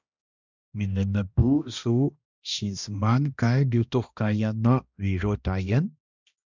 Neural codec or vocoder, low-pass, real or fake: codec, 16 kHz, 1 kbps, X-Codec, HuBERT features, trained on balanced general audio; 7.2 kHz; fake